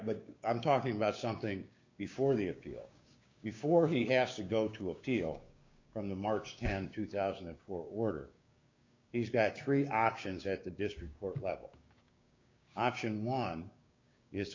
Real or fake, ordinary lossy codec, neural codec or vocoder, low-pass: fake; MP3, 48 kbps; codec, 16 kHz, 6 kbps, DAC; 7.2 kHz